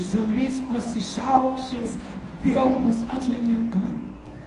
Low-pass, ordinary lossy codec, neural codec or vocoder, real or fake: 10.8 kHz; AAC, 48 kbps; codec, 24 kHz, 0.9 kbps, WavTokenizer, medium speech release version 1; fake